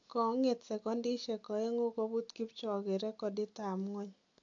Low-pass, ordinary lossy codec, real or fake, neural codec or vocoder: 7.2 kHz; AAC, 48 kbps; real; none